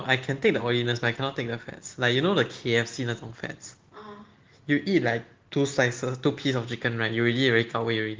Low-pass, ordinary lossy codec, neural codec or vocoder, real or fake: 7.2 kHz; Opus, 16 kbps; none; real